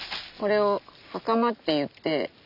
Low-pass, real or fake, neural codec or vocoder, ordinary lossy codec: 5.4 kHz; real; none; none